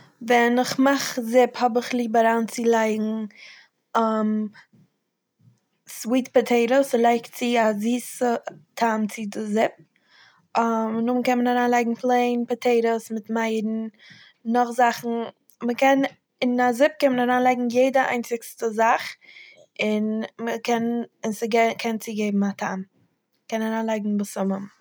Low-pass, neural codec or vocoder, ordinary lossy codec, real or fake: none; none; none; real